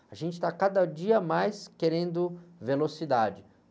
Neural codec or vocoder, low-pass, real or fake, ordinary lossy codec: none; none; real; none